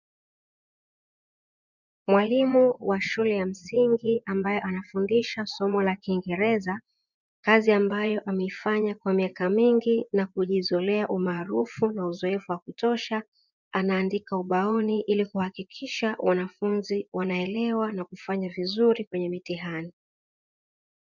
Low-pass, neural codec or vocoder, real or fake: 7.2 kHz; vocoder, 24 kHz, 100 mel bands, Vocos; fake